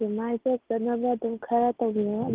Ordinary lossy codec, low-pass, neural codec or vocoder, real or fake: Opus, 16 kbps; 3.6 kHz; none; real